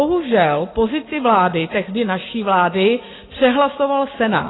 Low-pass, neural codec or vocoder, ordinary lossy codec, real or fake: 7.2 kHz; none; AAC, 16 kbps; real